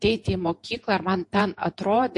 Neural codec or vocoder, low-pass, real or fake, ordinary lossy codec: vocoder, 48 kHz, 128 mel bands, Vocos; 10.8 kHz; fake; MP3, 48 kbps